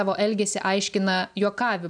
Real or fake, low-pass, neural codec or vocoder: real; 9.9 kHz; none